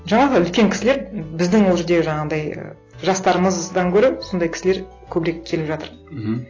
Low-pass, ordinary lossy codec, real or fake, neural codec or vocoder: 7.2 kHz; AAC, 32 kbps; real; none